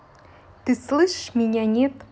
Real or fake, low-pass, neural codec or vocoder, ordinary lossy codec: real; none; none; none